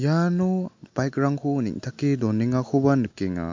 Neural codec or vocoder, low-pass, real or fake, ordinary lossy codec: none; 7.2 kHz; real; MP3, 48 kbps